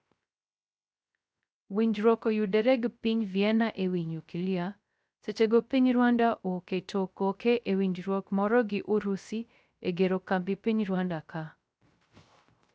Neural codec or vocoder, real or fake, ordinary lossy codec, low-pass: codec, 16 kHz, 0.3 kbps, FocalCodec; fake; none; none